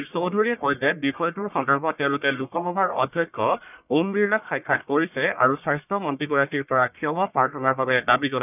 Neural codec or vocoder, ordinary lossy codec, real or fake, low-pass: codec, 44.1 kHz, 1.7 kbps, Pupu-Codec; none; fake; 3.6 kHz